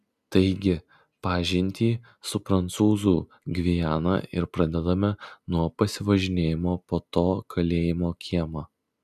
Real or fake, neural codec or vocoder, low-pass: real; none; 14.4 kHz